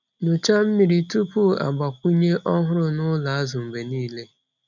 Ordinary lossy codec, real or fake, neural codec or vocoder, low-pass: none; real; none; 7.2 kHz